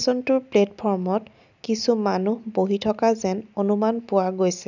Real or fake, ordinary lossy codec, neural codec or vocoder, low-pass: real; none; none; 7.2 kHz